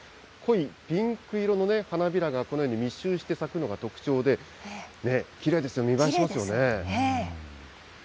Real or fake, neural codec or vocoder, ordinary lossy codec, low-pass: real; none; none; none